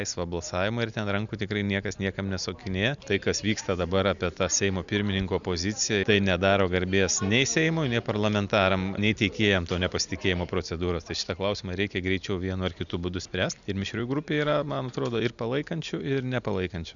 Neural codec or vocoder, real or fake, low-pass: none; real; 7.2 kHz